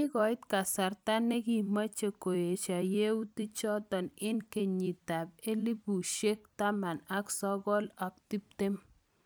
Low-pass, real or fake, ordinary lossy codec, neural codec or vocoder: none; fake; none; vocoder, 44.1 kHz, 128 mel bands every 256 samples, BigVGAN v2